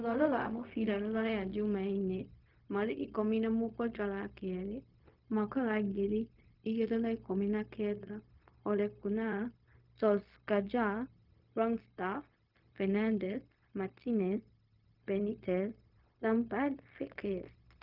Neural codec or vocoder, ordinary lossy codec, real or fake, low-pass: codec, 16 kHz, 0.4 kbps, LongCat-Audio-Codec; Opus, 24 kbps; fake; 5.4 kHz